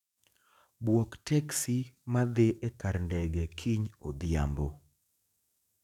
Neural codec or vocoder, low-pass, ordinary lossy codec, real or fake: codec, 44.1 kHz, 7.8 kbps, DAC; 19.8 kHz; none; fake